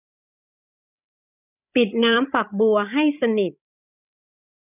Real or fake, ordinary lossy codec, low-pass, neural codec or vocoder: fake; none; 3.6 kHz; codec, 16 kHz, 16 kbps, FreqCodec, larger model